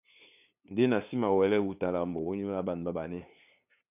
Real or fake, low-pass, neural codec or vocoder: fake; 3.6 kHz; codec, 16 kHz, 2 kbps, FunCodec, trained on LibriTTS, 25 frames a second